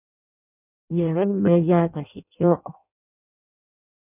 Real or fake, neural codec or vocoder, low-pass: fake; codec, 16 kHz in and 24 kHz out, 0.6 kbps, FireRedTTS-2 codec; 3.6 kHz